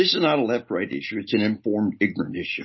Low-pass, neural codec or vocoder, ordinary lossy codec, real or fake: 7.2 kHz; none; MP3, 24 kbps; real